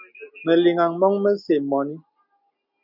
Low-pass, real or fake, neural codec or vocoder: 5.4 kHz; real; none